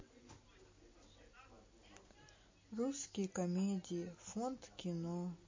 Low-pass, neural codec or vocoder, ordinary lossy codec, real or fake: 7.2 kHz; none; MP3, 32 kbps; real